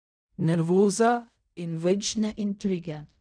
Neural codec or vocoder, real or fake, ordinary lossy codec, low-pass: codec, 16 kHz in and 24 kHz out, 0.4 kbps, LongCat-Audio-Codec, fine tuned four codebook decoder; fake; none; 9.9 kHz